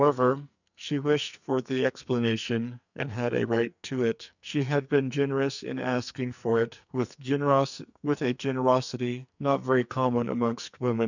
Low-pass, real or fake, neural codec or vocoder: 7.2 kHz; fake; codec, 44.1 kHz, 2.6 kbps, SNAC